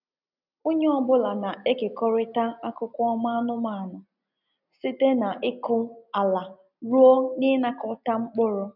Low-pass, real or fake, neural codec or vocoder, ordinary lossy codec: 5.4 kHz; real; none; none